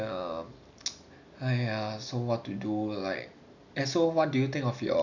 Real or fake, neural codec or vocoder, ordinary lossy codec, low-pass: real; none; none; 7.2 kHz